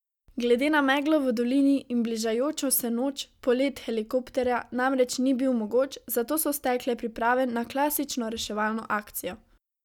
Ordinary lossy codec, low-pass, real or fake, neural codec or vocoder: none; 19.8 kHz; real; none